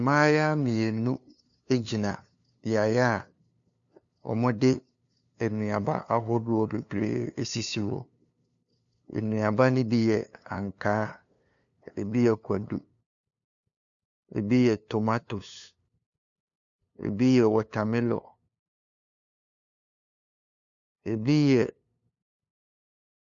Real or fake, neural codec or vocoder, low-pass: fake; codec, 16 kHz, 2 kbps, FunCodec, trained on LibriTTS, 25 frames a second; 7.2 kHz